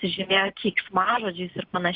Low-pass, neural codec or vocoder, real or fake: 5.4 kHz; none; real